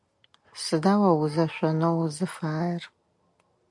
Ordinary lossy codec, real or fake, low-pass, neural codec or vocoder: MP3, 96 kbps; real; 10.8 kHz; none